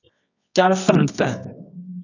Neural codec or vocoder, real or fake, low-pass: codec, 24 kHz, 0.9 kbps, WavTokenizer, medium music audio release; fake; 7.2 kHz